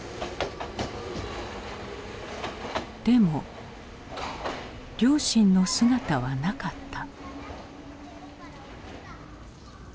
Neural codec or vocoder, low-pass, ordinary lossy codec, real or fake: none; none; none; real